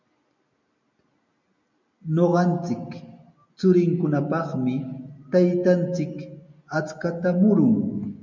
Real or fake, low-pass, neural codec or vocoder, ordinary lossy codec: real; 7.2 kHz; none; MP3, 64 kbps